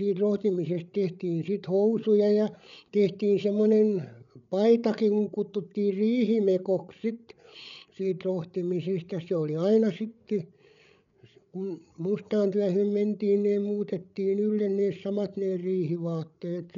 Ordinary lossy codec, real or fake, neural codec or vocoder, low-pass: none; fake; codec, 16 kHz, 8 kbps, FreqCodec, larger model; 7.2 kHz